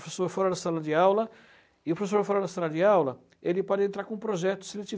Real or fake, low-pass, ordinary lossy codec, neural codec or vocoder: real; none; none; none